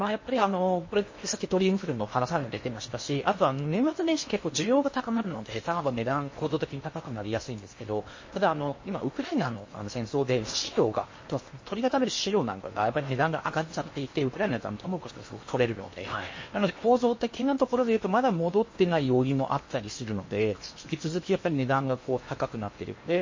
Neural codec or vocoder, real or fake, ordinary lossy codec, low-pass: codec, 16 kHz in and 24 kHz out, 0.8 kbps, FocalCodec, streaming, 65536 codes; fake; MP3, 32 kbps; 7.2 kHz